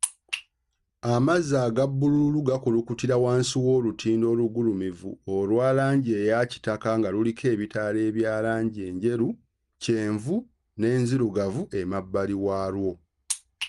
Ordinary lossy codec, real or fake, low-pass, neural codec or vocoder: Opus, 32 kbps; real; 10.8 kHz; none